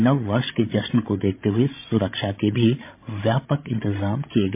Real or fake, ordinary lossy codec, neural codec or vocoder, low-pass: fake; MP3, 24 kbps; codec, 16 kHz, 16 kbps, FreqCodec, larger model; 3.6 kHz